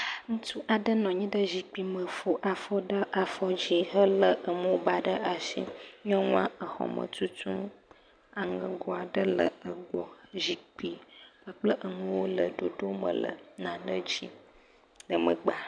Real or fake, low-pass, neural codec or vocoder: real; 9.9 kHz; none